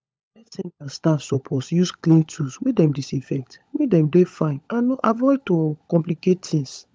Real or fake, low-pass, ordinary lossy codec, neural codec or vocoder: fake; none; none; codec, 16 kHz, 16 kbps, FunCodec, trained on LibriTTS, 50 frames a second